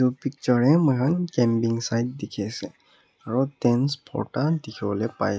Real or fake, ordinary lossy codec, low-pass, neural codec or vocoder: real; none; none; none